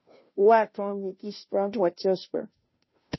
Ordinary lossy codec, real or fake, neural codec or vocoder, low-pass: MP3, 24 kbps; fake; codec, 16 kHz, 0.5 kbps, FunCodec, trained on Chinese and English, 25 frames a second; 7.2 kHz